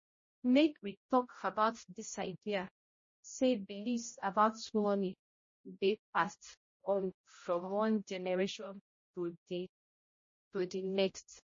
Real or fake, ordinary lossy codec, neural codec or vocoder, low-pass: fake; MP3, 32 kbps; codec, 16 kHz, 0.5 kbps, X-Codec, HuBERT features, trained on general audio; 7.2 kHz